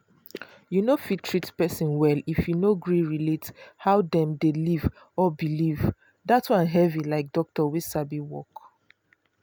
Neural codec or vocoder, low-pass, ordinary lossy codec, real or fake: none; none; none; real